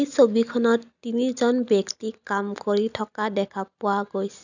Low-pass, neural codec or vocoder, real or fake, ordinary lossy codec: 7.2 kHz; none; real; none